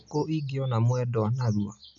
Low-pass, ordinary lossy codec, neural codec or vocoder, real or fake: 7.2 kHz; none; none; real